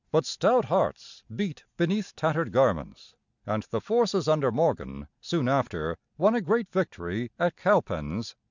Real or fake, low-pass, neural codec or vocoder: real; 7.2 kHz; none